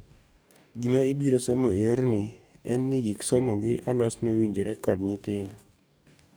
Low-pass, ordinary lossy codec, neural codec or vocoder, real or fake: none; none; codec, 44.1 kHz, 2.6 kbps, DAC; fake